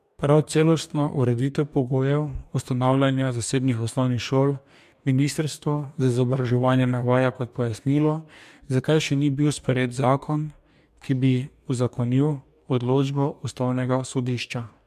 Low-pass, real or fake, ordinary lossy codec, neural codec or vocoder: 14.4 kHz; fake; MP3, 96 kbps; codec, 44.1 kHz, 2.6 kbps, DAC